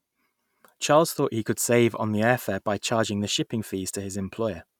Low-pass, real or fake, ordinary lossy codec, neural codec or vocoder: 19.8 kHz; real; none; none